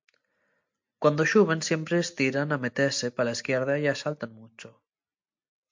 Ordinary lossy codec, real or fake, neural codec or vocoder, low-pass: MP3, 48 kbps; real; none; 7.2 kHz